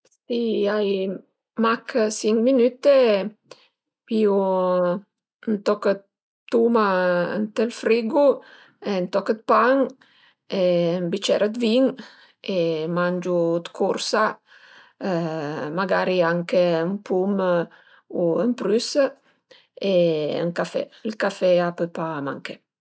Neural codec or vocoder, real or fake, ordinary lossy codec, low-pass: none; real; none; none